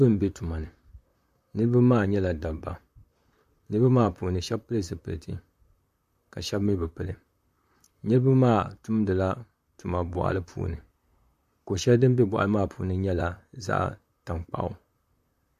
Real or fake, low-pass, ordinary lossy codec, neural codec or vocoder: fake; 14.4 kHz; MP3, 64 kbps; vocoder, 44.1 kHz, 128 mel bands, Pupu-Vocoder